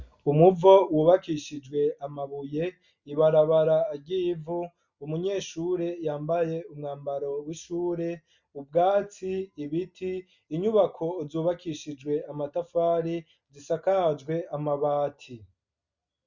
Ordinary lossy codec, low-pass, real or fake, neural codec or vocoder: Opus, 64 kbps; 7.2 kHz; real; none